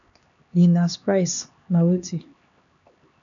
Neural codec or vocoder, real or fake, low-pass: codec, 16 kHz, 2 kbps, X-Codec, HuBERT features, trained on LibriSpeech; fake; 7.2 kHz